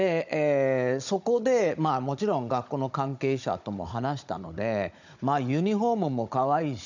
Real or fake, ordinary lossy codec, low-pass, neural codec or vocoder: fake; none; 7.2 kHz; codec, 16 kHz, 16 kbps, FunCodec, trained on Chinese and English, 50 frames a second